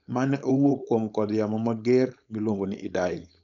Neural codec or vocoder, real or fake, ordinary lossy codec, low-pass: codec, 16 kHz, 4.8 kbps, FACodec; fake; MP3, 96 kbps; 7.2 kHz